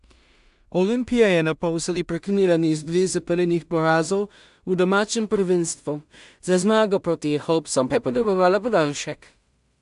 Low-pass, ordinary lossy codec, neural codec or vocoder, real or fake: 10.8 kHz; AAC, 96 kbps; codec, 16 kHz in and 24 kHz out, 0.4 kbps, LongCat-Audio-Codec, two codebook decoder; fake